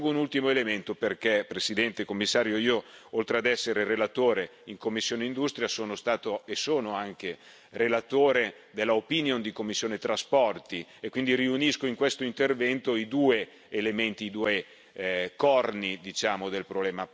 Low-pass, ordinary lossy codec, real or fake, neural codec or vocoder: none; none; real; none